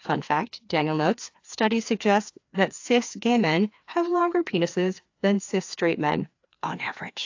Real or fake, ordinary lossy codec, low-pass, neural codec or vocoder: fake; AAC, 48 kbps; 7.2 kHz; codec, 16 kHz, 2 kbps, FreqCodec, larger model